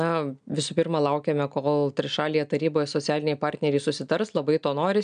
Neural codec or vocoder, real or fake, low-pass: none; real; 9.9 kHz